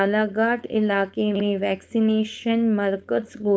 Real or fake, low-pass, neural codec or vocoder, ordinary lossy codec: fake; none; codec, 16 kHz, 4.8 kbps, FACodec; none